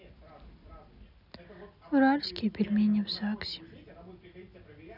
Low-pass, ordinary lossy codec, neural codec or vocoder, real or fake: 5.4 kHz; none; none; real